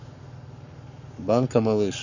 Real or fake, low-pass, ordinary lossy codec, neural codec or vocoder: fake; 7.2 kHz; none; codec, 44.1 kHz, 7.8 kbps, Pupu-Codec